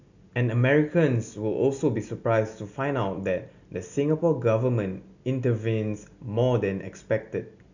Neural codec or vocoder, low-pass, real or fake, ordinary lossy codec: none; 7.2 kHz; real; none